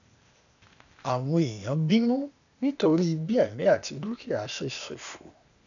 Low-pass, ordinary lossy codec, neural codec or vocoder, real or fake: 7.2 kHz; none; codec, 16 kHz, 0.8 kbps, ZipCodec; fake